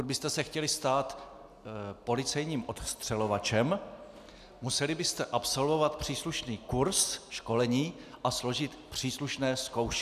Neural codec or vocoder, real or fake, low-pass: none; real; 14.4 kHz